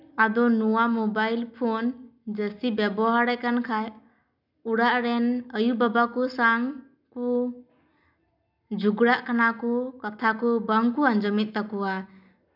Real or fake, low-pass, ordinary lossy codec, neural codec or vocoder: real; 5.4 kHz; none; none